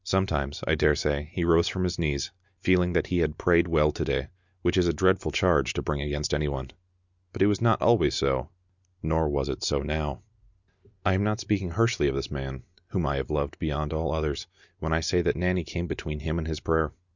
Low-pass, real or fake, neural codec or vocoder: 7.2 kHz; real; none